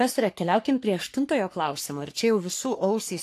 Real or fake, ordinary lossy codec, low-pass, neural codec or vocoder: fake; AAC, 64 kbps; 14.4 kHz; codec, 44.1 kHz, 3.4 kbps, Pupu-Codec